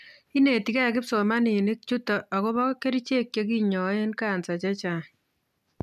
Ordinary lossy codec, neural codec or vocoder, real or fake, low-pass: none; none; real; 14.4 kHz